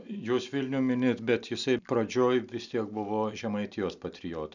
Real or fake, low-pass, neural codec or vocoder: real; 7.2 kHz; none